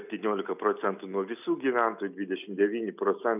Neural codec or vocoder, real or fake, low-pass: none; real; 3.6 kHz